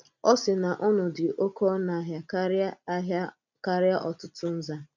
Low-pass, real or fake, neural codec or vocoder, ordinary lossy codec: 7.2 kHz; real; none; none